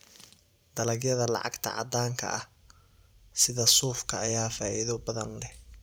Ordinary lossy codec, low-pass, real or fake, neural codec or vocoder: none; none; fake; vocoder, 44.1 kHz, 128 mel bands every 512 samples, BigVGAN v2